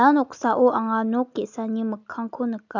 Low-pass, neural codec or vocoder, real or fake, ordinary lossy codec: 7.2 kHz; none; real; none